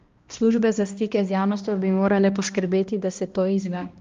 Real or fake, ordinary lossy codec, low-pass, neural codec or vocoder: fake; Opus, 32 kbps; 7.2 kHz; codec, 16 kHz, 1 kbps, X-Codec, HuBERT features, trained on balanced general audio